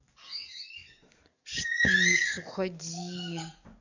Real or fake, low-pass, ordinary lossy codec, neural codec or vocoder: fake; 7.2 kHz; none; codec, 44.1 kHz, 7.8 kbps, DAC